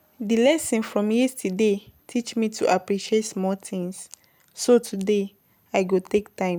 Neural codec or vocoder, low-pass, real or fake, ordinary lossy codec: none; none; real; none